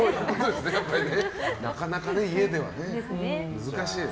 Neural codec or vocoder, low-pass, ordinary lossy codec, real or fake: none; none; none; real